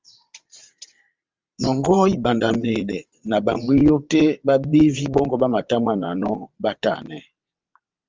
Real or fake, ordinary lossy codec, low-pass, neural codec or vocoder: fake; Opus, 32 kbps; 7.2 kHz; vocoder, 22.05 kHz, 80 mel bands, WaveNeXt